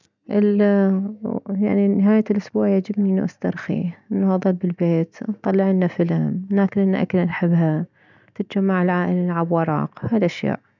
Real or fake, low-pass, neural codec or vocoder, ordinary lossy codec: real; 7.2 kHz; none; none